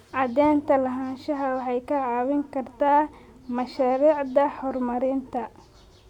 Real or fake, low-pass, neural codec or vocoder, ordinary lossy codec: real; 19.8 kHz; none; none